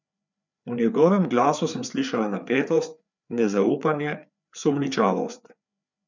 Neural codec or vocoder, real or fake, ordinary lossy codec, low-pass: codec, 16 kHz, 4 kbps, FreqCodec, larger model; fake; none; 7.2 kHz